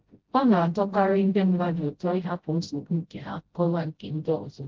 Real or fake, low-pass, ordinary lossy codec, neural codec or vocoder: fake; 7.2 kHz; Opus, 16 kbps; codec, 16 kHz, 0.5 kbps, FreqCodec, smaller model